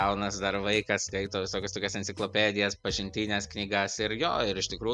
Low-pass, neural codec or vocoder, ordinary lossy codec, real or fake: 10.8 kHz; none; MP3, 96 kbps; real